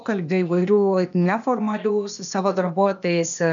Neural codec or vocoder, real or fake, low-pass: codec, 16 kHz, 0.8 kbps, ZipCodec; fake; 7.2 kHz